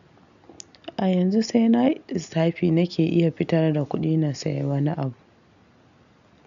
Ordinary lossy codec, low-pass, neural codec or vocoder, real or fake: none; 7.2 kHz; none; real